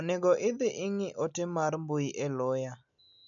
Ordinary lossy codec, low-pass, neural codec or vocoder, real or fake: none; 7.2 kHz; none; real